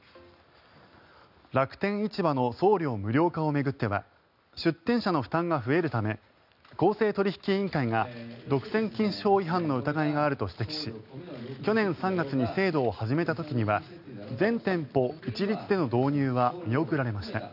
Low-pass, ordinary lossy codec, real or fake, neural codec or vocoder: 5.4 kHz; none; real; none